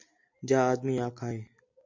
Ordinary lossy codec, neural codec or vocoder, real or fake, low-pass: MP3, 48 kbps; none; real; 7.2 kHz